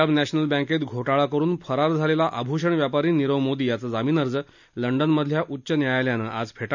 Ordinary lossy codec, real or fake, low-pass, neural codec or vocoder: none; real; 7.2 kHz; none